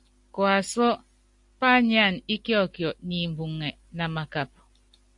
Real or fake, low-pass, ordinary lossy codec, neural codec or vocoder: real; 10.8 kHz; AAC, 64 kbps; none